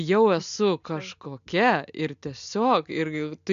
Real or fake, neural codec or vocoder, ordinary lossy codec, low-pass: real; none; AAC, 96 kbps; 7.2 kHz